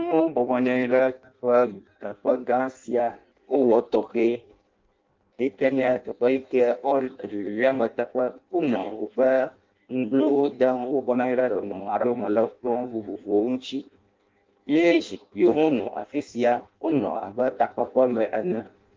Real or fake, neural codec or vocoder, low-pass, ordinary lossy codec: fake; codec, 16 kHz in and 24 kHz out, 0.6 kbps, FireRedTTS-2 codec; 7.2 kHz; Opus, 24 kbps